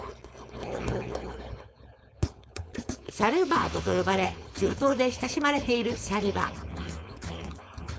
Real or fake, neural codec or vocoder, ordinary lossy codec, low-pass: fake; codec, 16 kHz, 4.8 kbps, FACodec; none; none